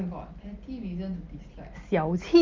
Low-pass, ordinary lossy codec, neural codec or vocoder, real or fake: 7.2 kHz; Opus, 24 kbps; none; real